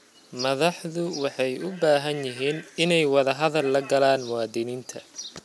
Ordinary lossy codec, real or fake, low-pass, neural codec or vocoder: none; real; none; none